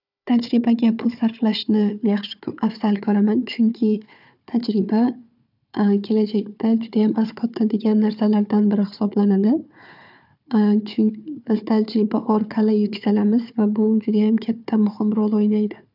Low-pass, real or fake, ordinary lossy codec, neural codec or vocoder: 5.4 kHz; fake; none; codec, 16 kHz, 4 kbps, FunCodec, trained on Chinese and English, 50 frames a second